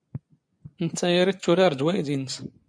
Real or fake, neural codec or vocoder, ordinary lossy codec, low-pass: fake; vocoder, 24 kHz, 100 mel bands, Vocos; MP3, 64 kbps; 9.9 kHz